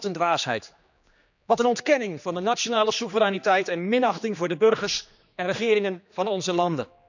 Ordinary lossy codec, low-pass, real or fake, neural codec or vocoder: none; 7.2 kHz; fake; codec, 16 kHz, 2 kbps, X-Codec, HuBERT features, trained on general audio